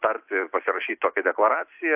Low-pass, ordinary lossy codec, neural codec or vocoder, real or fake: 3.6 kHz; AAC, 32 kbps; none; real